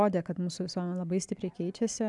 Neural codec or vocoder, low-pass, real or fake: none; 10.8 kHz; real